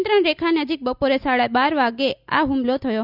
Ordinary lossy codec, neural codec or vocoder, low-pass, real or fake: none; none; 5.4 kHz; real